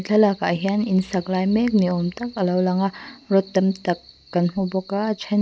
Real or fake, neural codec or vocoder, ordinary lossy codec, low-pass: real; none; none; none